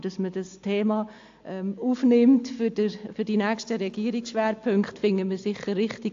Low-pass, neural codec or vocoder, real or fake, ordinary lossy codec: 7.2 kHz; none; real; AAC, 48 kbps